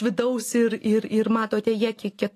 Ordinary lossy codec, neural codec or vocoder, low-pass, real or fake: AAC, 48 kbps; none; 14.4 kHz; real